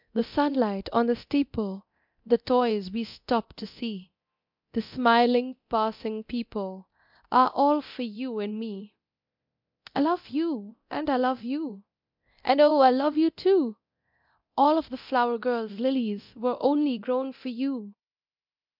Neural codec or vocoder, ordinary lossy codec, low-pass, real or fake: codec, 24 kHz, 0.9 kbps, DualCodec; MP3, 48 kbps; 5.4 kHz; fake